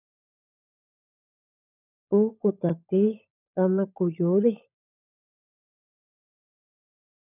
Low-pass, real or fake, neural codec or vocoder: 3.6 kHz; fake; codec, 16 kHz, 16 kbps, FunCodec, trained on LibriTTS, 50 frames a second